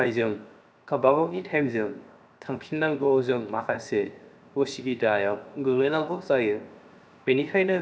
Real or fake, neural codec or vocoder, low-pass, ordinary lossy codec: fake; codec, 16 kHz, 0.7 kbps, FocalCodec; none; none